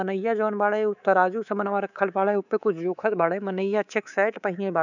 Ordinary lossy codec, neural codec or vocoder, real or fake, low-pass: none; codec, 24 kHz, 3.1 kbps, DualCodec; fake; 7.2 kHz